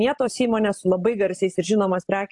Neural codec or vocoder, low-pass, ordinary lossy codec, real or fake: none; 10.8 kHz; AAC, 64 kbps; real